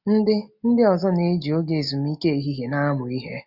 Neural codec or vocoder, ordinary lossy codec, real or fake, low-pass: none; none; real; 5.4 kHz